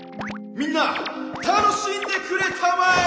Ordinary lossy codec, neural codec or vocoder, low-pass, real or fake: none; none; none; real